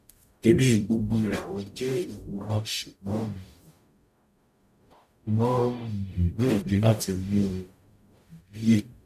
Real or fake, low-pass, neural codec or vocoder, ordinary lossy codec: fake; 14.4 kHz; codec, 44.1 kHz, 0.9 kbps, DAC; none